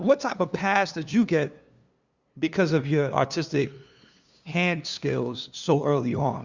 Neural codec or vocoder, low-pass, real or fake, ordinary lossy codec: codec, 16 kHz, 2 kbps, FunCodec, trained on LibriTTS, 25 frames a second; 7.2 kHz; fake; Opus, 64 kbps